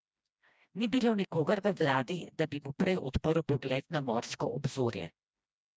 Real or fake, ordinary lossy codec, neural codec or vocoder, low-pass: fake; none; codec, 16 kHz, 1 kbps, FreqCodec, smaller model; none